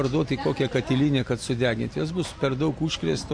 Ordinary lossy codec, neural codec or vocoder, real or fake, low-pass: MP3, 48 kbps; none; real; 9.9 kHz